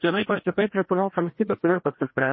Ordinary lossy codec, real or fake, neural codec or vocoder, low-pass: MP3, 24 kbps; fake; codec, 16 kHz, 1 kbps, FreqCodec, larger model; 7.2 kHz